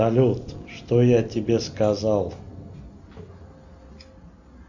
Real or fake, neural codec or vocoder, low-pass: real; none; 7.2 kHz